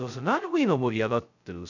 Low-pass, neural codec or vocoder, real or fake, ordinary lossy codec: 7.2 kHz; codec, 16 kHz, 0.2 kbps, FocalCodec; fake; none